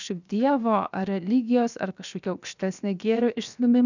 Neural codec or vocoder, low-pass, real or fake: codec, 16 kHz, 0.7 kbps, FocalCodec; 7.2 kHz; fake